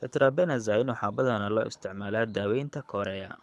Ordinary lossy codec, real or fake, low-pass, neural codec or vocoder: none; fake; none; codec, 24 kHz, 6 kbps, HILCodec